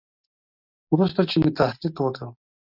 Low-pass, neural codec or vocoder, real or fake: 5.4 kHz; none; real